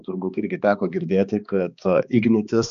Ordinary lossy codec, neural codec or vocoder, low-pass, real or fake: MP3, 96 kbps; codec, 16 kHz, 2 kbps, X-Codec, HuBERT features, trained on balanced general audio; 7.2 kHz; fake